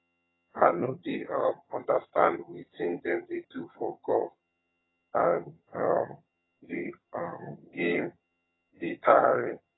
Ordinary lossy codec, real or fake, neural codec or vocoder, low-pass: AAC, 16 kbps; fake; vocoder, 22.05 kHz, 80 mel bands, HiFi-GAN; 7.2 kHz